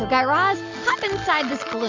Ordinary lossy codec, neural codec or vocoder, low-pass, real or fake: MP3, 48 kbps; none; 7.2 kHz; real